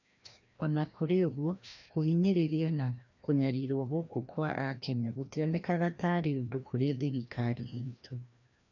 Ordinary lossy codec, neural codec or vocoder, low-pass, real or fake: none; codec, 16 kHz, 1 kbps, FreqCodec, larger model; 7.2 kHz; fake